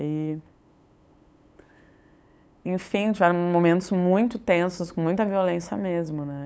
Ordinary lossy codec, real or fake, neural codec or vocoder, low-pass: none; fake; codec, 16 kHz, 8 kbps, FunCodec, trained on LibriTTS, 25 frames a second; none